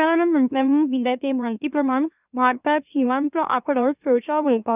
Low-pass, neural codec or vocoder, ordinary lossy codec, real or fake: 3.6 kHz; autoencoder, 44.1 kHz, a latent of 192 numbers a frame, MeloTTS; none; fake